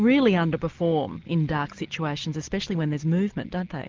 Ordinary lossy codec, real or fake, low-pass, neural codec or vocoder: Opus, 24 kbps; real; 7.2 kHz; none